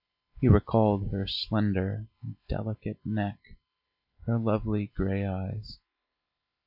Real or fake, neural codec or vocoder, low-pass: real; none; 5.4 kHz